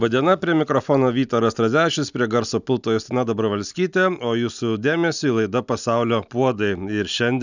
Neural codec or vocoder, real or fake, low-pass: none; real; 7.2 kHz